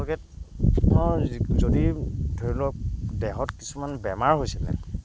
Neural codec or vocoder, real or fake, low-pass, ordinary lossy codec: none; real; none; none